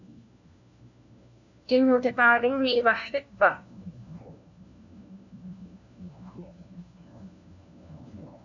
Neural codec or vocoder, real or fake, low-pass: codec, 16 kHz, 1 kbps, FunCodec, trained on LibriTTS, 50 frames a second; fake; 7.2 kHz